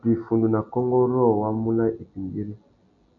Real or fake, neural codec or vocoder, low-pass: real; none; 7.2 kHz